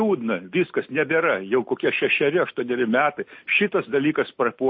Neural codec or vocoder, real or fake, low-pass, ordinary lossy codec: none; real; 5.4 kHz; MP3, 32 kbps